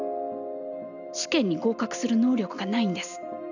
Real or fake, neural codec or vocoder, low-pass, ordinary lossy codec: real; none; 7.2 kHz; none